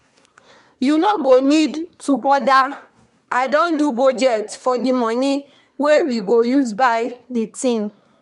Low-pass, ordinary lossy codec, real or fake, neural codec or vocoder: 10.8 kHz; none; fake; codec, 24 kHz, 1 kbps, SNAC